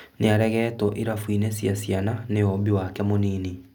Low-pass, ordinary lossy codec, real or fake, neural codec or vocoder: 19.8 kHz; none; real; none